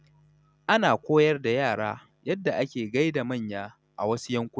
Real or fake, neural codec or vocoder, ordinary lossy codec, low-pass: real; none; none; none